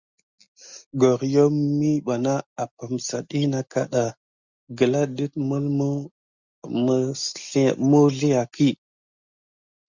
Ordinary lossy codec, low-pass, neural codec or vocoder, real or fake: Opus, 64 kbps; 7.2 kHz; none; real